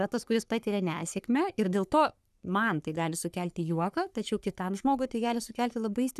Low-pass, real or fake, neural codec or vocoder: 14.4 kHz; fake; codec, 44.1 kHz, 3.4 kbps, Pupu-Codec